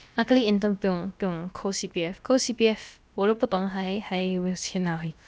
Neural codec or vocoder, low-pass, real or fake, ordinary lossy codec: codec, 16 kHz, about 1 kbps, DyCAST, with the encoder's durations; none; fake; none